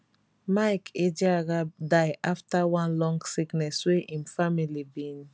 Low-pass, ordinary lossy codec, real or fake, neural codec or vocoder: none; none; real; none